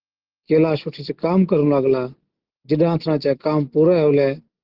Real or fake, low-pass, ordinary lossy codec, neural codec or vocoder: real; 5.4 kHz; Opus, 16 kbps; none